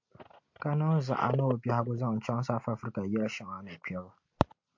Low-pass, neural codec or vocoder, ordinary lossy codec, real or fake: 7.2 kHz; none; MP3, 48 kbps; real